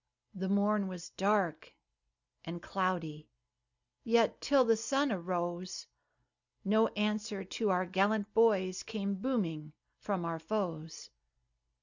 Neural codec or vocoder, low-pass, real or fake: none; 7.2 kHz; real